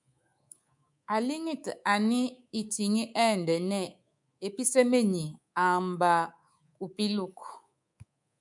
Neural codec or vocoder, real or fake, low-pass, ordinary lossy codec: codec, 24 kHz, 3.1 kbps, DualCodec; fake; 10.8 kHz; MP3, 96 kbps